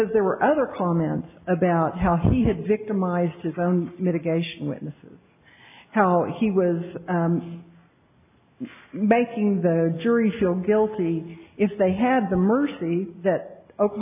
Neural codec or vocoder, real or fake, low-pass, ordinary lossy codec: none; real; 3.6 kHz; AAC, 32 kbps